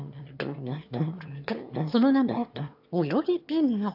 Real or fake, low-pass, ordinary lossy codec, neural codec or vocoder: fake; 5.4 kHz; AAC, 48 kbps; autoencoder, 22.05 kHz, a latent of 192 numbers a frame, VITS, trained on one speaker